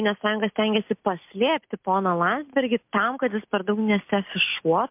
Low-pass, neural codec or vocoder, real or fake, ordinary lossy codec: 3.6 kHz; none; real; MP3, 32 kbps